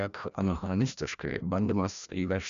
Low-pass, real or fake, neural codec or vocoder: 7.2 kHz; fake; codec, 16 kHz, 1 kbps, FreqCodec, larger model